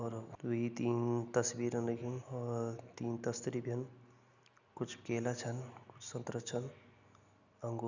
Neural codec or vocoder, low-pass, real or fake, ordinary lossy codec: none; 7.2 kHz; real; none